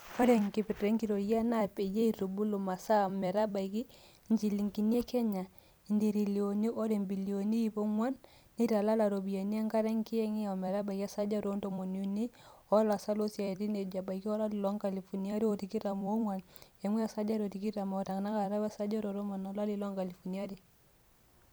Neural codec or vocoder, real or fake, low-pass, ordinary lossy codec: vocoder, 44.1 kHz, 128 mel bands every 256 samples, BigVGAN v2; fake; none; none